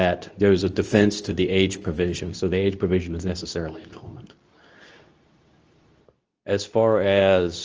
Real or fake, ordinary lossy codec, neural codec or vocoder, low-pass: fake; Opus, 24 kbps; codec, 24 kHz, 0.9 kbps, WavTokenizer, medium speech release version 2; 7.2 kHz